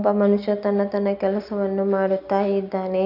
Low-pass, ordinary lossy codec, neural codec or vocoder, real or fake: 5.4 kHz; none; none; real